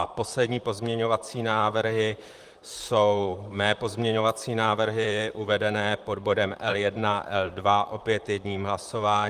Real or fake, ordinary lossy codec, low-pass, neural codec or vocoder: fake; Opus, 32 kbps; 14.4 kHz; vocoder, 44.1 kHz, 128 mel bands, Pupu-Vocoder